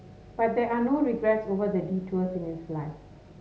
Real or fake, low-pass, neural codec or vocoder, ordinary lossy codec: real; none; none; none